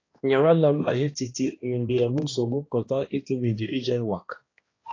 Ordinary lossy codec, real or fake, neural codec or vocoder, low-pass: AAC, 32 kbps; fake; codec, 16 kHz, 1 kbps, X-Codec, HuBERT features, trained on balanced general audio; 7.2 kHz